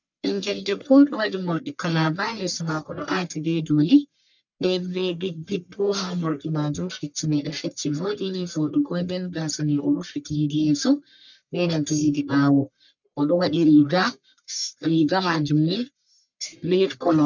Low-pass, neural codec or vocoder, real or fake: 7.2 kHz; codec, 44.1 kHz, 1.7 kbps, Pupu-Codec; fake